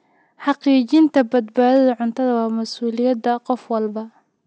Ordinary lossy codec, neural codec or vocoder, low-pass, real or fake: none; none; none; real